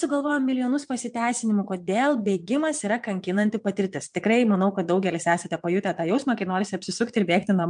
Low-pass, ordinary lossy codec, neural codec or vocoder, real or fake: 9.9 kHz; MP3, 64 kbps; vocoder, 22.05 kHz, 80 mel bands, Vocos; fake